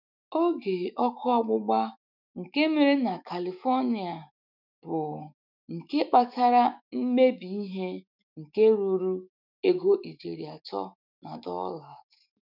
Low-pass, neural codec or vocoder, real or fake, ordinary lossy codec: 5.4 kHz; autoencoder, 48 kHz, 128 numbers a frame, DAC-VAE, trained on Japanese speech; fake; none